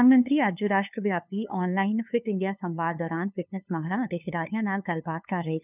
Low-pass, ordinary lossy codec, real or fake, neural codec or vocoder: 3.6 kHz; none; fake; codec, 16 kHz, 2 kbps, FunCodec, trained on LibriTTS, 25 frames a second